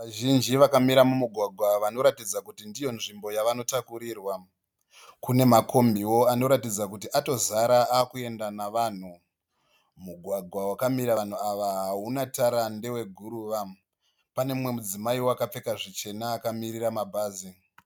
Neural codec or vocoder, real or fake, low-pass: none; real; 19.8 kHz